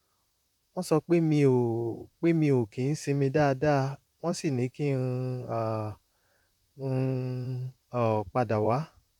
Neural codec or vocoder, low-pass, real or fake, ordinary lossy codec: vocoder, 44.1 kHz, 128 mel bands, Pupu-Vocoder; 19.8 kHz; fake; none